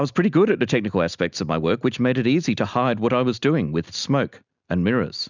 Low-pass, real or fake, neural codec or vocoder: 7.2 kHz; real; none